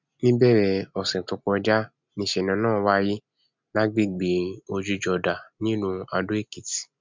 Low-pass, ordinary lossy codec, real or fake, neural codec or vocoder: 7.2 kHz; MP3, 64 kbps; real; none